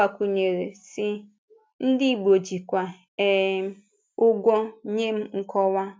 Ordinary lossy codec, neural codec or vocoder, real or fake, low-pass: none; none; real; none